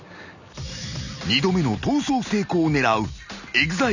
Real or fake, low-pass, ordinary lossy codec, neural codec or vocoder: real; 7.2 kHz; none; none